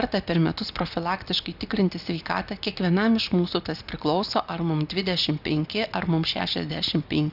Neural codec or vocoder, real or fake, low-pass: none; real; 5.4 kHz